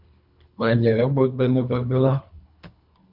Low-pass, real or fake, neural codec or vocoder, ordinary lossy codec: 5.4 kHz; fake; codec, 24 kHz, 3 kbps, HILCodec; MP3, 48 kbps